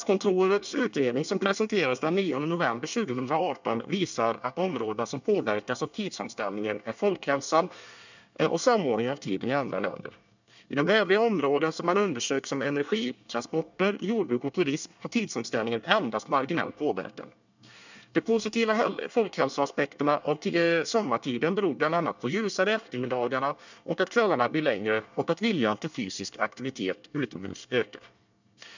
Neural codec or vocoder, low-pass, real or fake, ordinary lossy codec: codec, 24 kHz, 1 kbps, SNAC; 7.2 kHz; fake; none